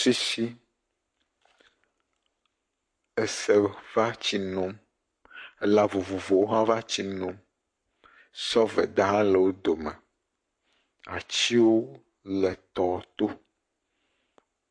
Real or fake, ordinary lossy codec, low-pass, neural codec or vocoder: fake; MP3, 48 kbps; 9.9 kHz; codec, 44.1 kHz, 7.8 kbps, Pupu-Codec